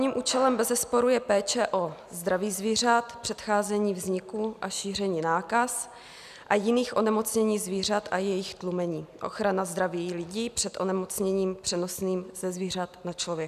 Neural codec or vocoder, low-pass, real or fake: none; 14.4 kHz; real